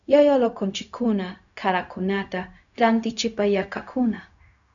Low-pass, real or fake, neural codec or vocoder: 7.2 kHz; fake; codec, 16 kHz, 0.4 kbps, LongCat-Audio-Codec